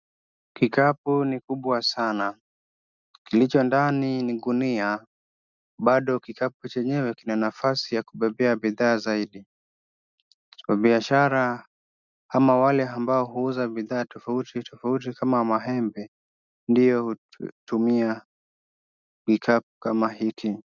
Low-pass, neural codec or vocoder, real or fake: 7.2 kHz; none; real